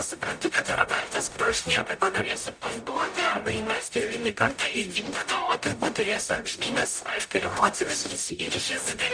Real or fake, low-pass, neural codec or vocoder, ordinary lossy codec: fake; 9.9 kHz; codec, 44.1 kHz, 0.9 kbps, DAC; MP3, 64 kbps